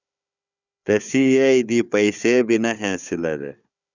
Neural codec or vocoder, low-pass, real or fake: codec, 16 kHz, 4 kbps, FunCodec, trained on Chinese and English, 50 frames a second; 7.2 kHz; fake